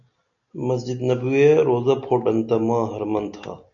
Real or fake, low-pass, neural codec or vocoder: real; 7.2 kHz; none